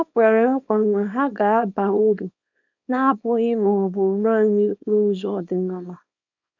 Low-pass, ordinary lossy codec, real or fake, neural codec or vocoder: 7.2 kHz; none; fake; codec, 24 kHz, 0.9 kbps, WavTokenizer, small release